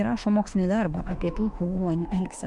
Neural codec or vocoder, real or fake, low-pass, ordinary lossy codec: autoencoder, 48 kHz, 32 numbers a frame, DAC-VAE, trained on Japanese speech; fake; 10.8 kHz; MP3, 64 kbps